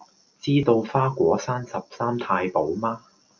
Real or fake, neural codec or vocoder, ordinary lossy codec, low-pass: real; none; MP3, 48 kbps; 7.2 kHz